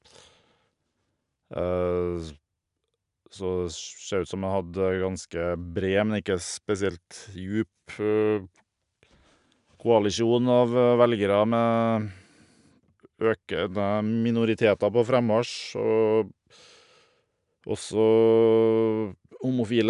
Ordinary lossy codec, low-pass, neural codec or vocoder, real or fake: none; 10.8 kHz; none; real